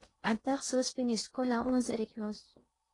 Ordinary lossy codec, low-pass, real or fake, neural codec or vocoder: AAC, 32 kbps; 10.8 kHz; fake; codec, 16 kHz in and 24 kHz out, 0.8 kbps, FocalCodec, streaming, 65536 codes